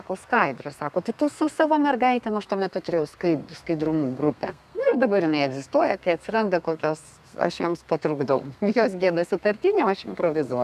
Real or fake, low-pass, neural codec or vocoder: fake; 14.4 kHz; codec, 32 kHz, 1.9 kbps, SNAC